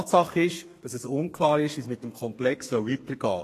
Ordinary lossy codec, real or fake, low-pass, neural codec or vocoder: AAC, 48 kbps; fake; 14.4 kHz; codec, 32 kHz, 1.9 kbps, SNAC